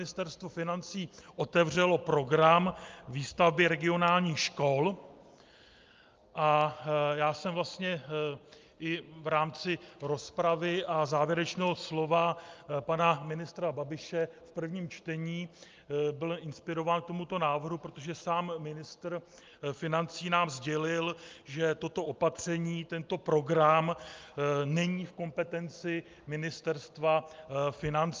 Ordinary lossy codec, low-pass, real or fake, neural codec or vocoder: Opus, 24 kbps; 7.2 kHz; real; none